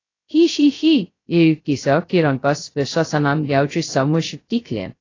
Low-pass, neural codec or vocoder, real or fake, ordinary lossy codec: 7.2 kHz; codec, 16 kHz, 0.2 kbps, FocalCodec; fake; AAC, 32 kbps